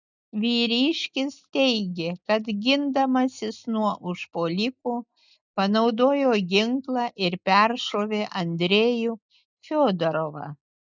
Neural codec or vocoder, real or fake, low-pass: none; real; 7.2 kHz